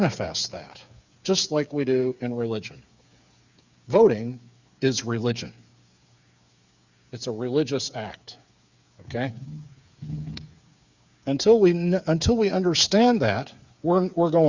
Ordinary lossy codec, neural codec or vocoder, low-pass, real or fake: Opus, 64 kbps; codec, 16 kHz, 8 kbps, FreqCodec, smaller model; 7.2 kHz; fake